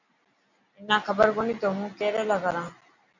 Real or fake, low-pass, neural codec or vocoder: real; 7.2 kHz; none